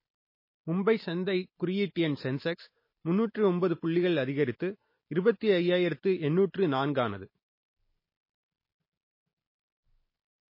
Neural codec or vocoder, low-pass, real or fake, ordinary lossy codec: none; 5.4 kHz; real; MP3, 24 kbps